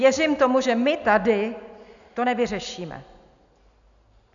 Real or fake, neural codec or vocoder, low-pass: real; none; 7.2 kHz